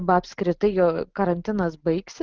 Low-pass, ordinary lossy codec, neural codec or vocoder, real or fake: 7.2 kHz; Opus, 16 kbps; none; real